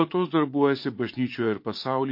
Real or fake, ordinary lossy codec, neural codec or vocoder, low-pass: fake; MP3, 32 kbps; vocoder, 44.1 kHz, 80 mel bands, Vocos; 5.4 kHz